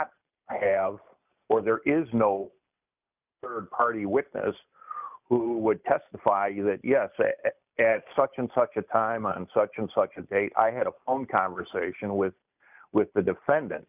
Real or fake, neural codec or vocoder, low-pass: real; none; 3.6 kHz